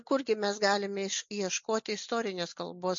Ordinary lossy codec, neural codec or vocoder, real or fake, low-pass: MP3, 48 kbps; none; real; 7.2 kHz